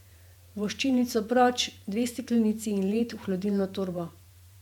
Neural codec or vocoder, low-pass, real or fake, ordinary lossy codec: vocoder, 48 kHz, 128 mel bands, Vocos; 19.8 kHz; fake; MP3, 96 kbps